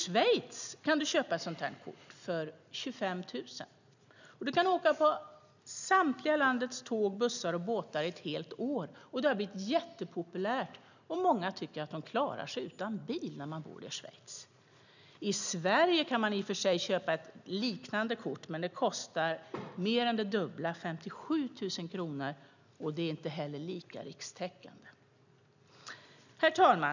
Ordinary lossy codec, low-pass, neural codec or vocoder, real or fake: none; 7.2 kHz; none; real